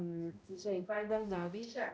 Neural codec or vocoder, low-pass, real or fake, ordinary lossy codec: codec, 16 kHz, 0.5 kbps, X-Codec, HuBERT features, trained on balanced general audio; none; fake; none